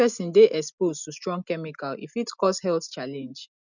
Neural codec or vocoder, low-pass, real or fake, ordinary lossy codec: none; 7.2 kHz; real; none